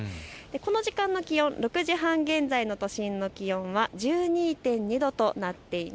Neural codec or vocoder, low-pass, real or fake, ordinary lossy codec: none; none; real; none